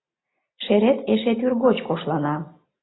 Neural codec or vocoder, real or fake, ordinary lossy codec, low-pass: none; real; AAC, 16 kbps; 7.2 kHz